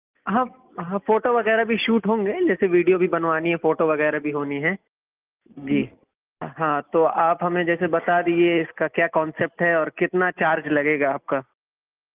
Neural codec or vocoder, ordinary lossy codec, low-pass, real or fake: none; Opus, 24 kbps; 3.6 kHz; real